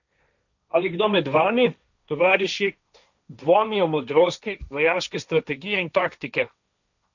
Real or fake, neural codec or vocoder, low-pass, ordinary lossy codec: fake; codec, 16 kHz, 1.1 kbps, Voila-Tokenizer; 7.2 kHz; none